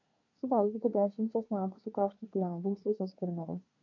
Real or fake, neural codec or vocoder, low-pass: fake; codec, 24 kHz, 1 kbps, SNAC; 7.2 kHz